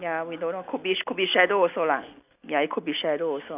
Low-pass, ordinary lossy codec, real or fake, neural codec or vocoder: 3.6 kHz; none; real; none